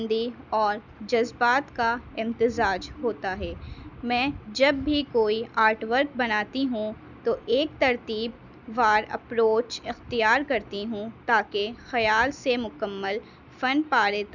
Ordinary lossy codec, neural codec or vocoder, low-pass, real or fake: none; none; 7.2 kHz; real